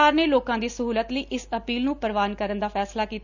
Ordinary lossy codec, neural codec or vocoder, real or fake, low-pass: none; none; real; 7.2 kHz